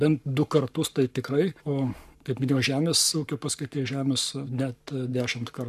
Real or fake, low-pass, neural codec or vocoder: fake; 14.4 kHz; codec, 44.1 kHz, 7.8 kbps, Pupu-Codec